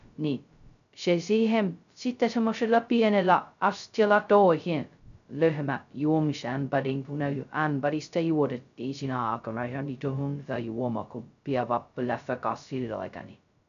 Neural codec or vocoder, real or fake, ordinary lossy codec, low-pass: codec, 16 kHz, 0.2 kbps, FocalCodec; fake; none; 7.2 kHz